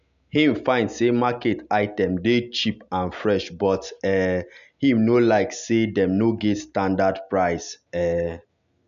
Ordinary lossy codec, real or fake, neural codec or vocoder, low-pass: none; real; none; 7.2 kHz